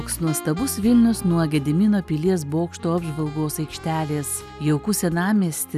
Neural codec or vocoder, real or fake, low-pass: none; real; 14.4 kHz